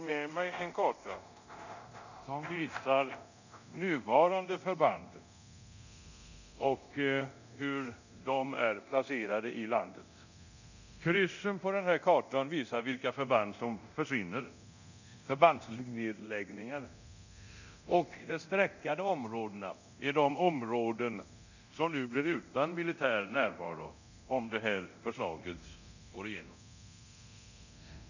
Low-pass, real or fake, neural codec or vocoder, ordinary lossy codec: 7.2 kHz; fake; codec, 24 kHz, 0.9 kbps, DualCodec; none